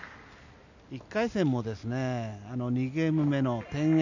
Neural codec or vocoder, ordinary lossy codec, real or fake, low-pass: none; none; real; 7.2 kHz